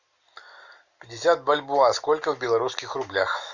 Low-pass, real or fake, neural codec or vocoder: 7.2 kHz; real; none